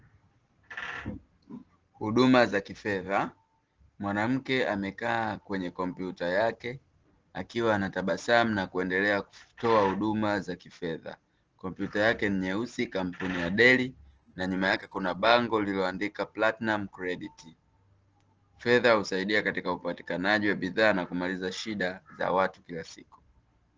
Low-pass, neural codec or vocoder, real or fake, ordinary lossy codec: 7.2 kHz; none; real; Opus, 16 kbps